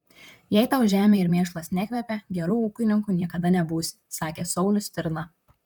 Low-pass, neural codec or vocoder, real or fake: 19.8 kHz; vocoder, 44.1 kHz, 128 mel bands, Pupu-Vocoder; fake